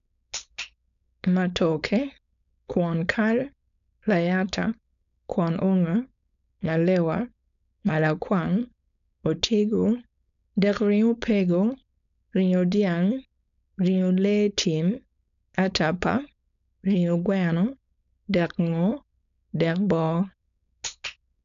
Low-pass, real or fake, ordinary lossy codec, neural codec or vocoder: 7.2 kHz; fake; none; codec, 16 kHz, 4.8 kbps, FACodec